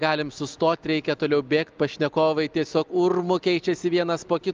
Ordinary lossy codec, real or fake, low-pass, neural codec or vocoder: Opus, 32 kbps; real; 7.2 kHz; none